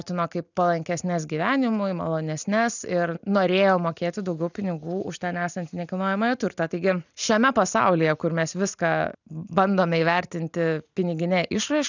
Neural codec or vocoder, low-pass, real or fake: none; 7.2 kHz; real